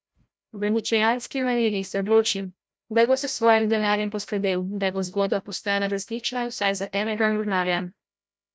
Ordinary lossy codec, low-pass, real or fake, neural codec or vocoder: none; none; fake; codec, 16 kHz, 0.5 kbps, FreqCodec, larger model